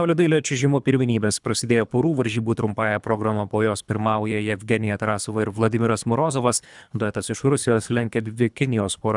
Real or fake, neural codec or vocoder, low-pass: fake; codec, 24 kHz, 3 kbps, HILCodec; 10.8 kHz